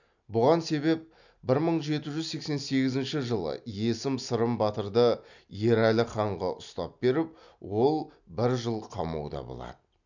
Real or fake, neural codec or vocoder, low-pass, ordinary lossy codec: real; none; 7.2 kHz; none